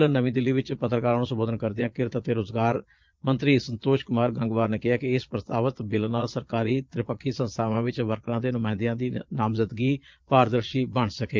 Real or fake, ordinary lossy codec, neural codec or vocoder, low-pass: fake; Opus, 24 kbps; vocoder, 22.05 kHz, 80 mel bands, Vocos; 7.2 kHz